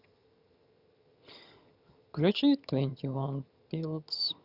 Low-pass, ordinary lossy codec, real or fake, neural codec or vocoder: 5.4 kHz; Opus, 64 kbps; fake; vocoder, 22.05 kHz, 80 mel bands, HiFi-GAN